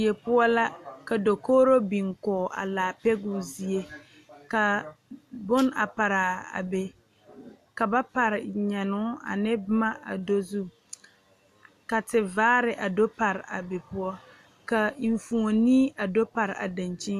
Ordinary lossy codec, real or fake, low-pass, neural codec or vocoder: MP3, 96 kbps; real; 14.4 kHz; none